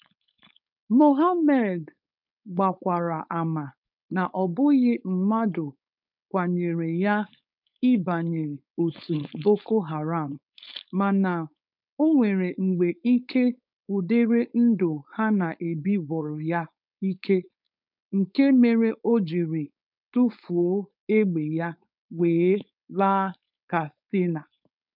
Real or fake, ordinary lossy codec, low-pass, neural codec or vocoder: fake; none; 5.4 kHz; codec, 16 kHz, 4.8 kbps, FACodec